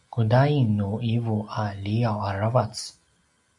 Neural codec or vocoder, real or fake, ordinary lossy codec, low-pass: none; real; MP3, 96 kbps; 10.8 kHz